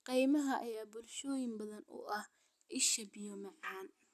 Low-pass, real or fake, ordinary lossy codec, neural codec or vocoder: 14.4 kHz; real; none; none